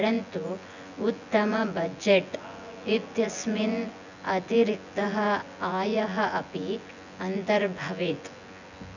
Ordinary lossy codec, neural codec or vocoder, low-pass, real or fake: none; vocoder, 24 kHz, 100 mel bands, Vocos; 7.2 kHz; fake